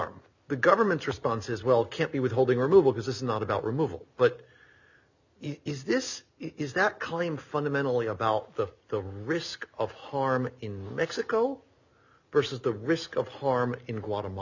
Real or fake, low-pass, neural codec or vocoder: real; 7.2 kHz; none